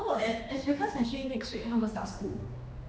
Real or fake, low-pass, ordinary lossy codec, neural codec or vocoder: fake; none; none; codec, 16 kHz, 2 kbps, X-Codec, HuBERT features, trained on general audio